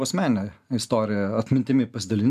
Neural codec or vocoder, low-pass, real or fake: none; 14.4 kHz; real